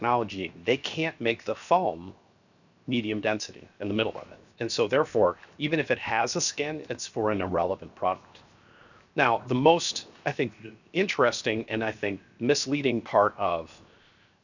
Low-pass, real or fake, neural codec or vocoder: 7.2 kHz; fake; codec, 16 kHz, 0.7 kbps, FocalCodec